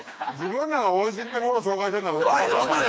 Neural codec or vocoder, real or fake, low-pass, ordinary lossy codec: codec, 16 kHz, 2 kbps, FreqCodec, smaller model; fake; none; none